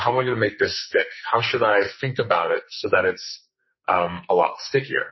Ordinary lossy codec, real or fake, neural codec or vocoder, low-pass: MP3, 24 kbps; fake; codec, 44.1 kHz, 2.6 kbps, SNAC; 7.2 kHz